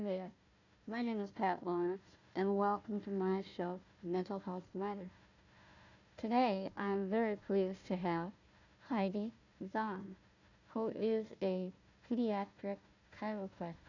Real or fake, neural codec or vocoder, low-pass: fake; codec, 16 kHz, 1 kbps, FunCodec, trained on Chinese and English, 50 frames a second; 7.2 kHz